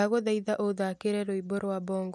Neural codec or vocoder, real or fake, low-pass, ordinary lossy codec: none; real; none; none